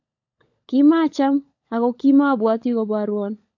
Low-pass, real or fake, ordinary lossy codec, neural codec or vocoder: 7.2 kHz; fake; AAC, 48 kbps; codec, 16 kHz, 16 kbps, FunCodec, trained on LibriTTS, 50 frames a second